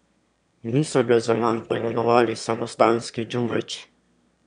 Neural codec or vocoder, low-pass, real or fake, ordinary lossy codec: autoencoder, 22.05 kHz, a latent of 192 numbers a frame, VITS, trained on one speaker; 9.9 kHz; fake; none